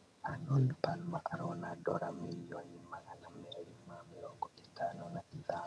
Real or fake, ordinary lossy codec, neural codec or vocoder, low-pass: fake; none; vocoder, 22.05 kHz, 80 mel bands, HiFi-GAN; none